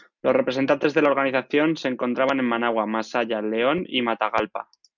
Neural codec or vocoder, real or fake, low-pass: none; real; 7.2 kHz